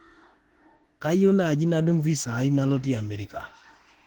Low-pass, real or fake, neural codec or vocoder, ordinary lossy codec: 19.8 kHz; fake; autoencoder, 48 kHz, 32 numbers a frame, DAC-VAE, trained on Japanese speech; Opus, 16 kbps